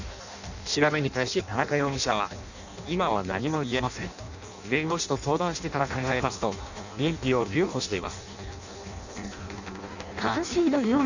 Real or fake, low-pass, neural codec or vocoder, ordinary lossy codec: fake; 7.2 kHz; codec, 16 kHz in and 24 kHz out, 0.6 kbps, FireRedTTS-2 codec; none